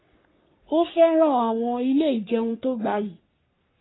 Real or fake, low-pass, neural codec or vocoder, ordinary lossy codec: fake; 7.2 kHz; codec, 44.1 kHz, 3.4 kbps, Pupu-Codec; AAC, 16 kbps